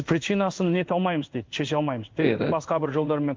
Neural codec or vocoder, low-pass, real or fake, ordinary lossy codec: codec, 16 kHz in and 24 kHz out, 1 kbps, XY-Tokenizer; 7.2 kHz; fake; Opus, 24 kbps